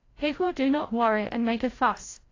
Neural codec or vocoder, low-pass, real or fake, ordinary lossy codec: codec, 16 kHz, 0.5 kbps, FreqCodec, larger model; 7.2 kHz; fake; AAC, 32 kbps